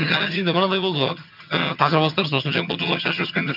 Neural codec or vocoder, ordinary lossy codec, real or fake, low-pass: vocoder, 22.05 kHz, 80 mel bands, HiFi-GAN; none; fake; 5.4 kHz